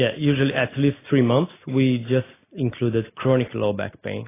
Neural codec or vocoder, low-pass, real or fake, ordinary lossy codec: none; 3.6 kHz; real; AAC, 16 kbps